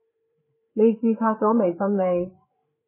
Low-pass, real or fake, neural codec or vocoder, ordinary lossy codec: 3.6 kHz; fake; codec, 16 kHz, 4 kbps, FreqCodec, larger model; MP3, 16 kbps